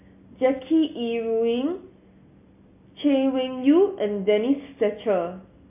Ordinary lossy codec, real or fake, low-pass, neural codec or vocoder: MP3, 24 kbps; real; 3.6 kHz; none